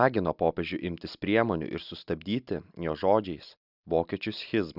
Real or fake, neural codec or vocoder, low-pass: real; none; 5.4 kHz